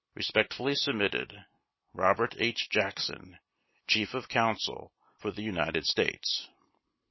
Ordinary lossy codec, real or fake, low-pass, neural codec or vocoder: MP3, 24 kbps; real; 7.2 kHz; none